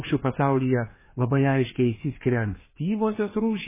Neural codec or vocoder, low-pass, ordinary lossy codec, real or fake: codec, 16 kHz, 2 kbps, FreqCodec, larger model; 3.6 kHz; MP3, 16 kbps; fake